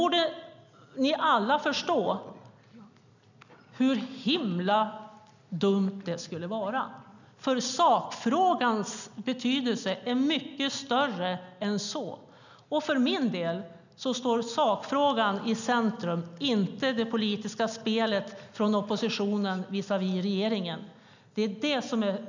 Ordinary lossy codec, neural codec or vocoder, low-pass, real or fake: none; none; 7.2 kHz; real